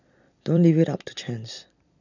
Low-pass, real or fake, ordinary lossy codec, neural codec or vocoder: 7.2 kHz; fake; none; vocoder, 44.1 kHz, 128 mel bands every 256 samples, BigVGAN v2